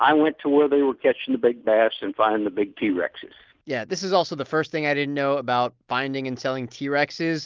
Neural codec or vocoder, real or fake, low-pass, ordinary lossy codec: none; real; 7.2 kHz; Opus, 32 kbps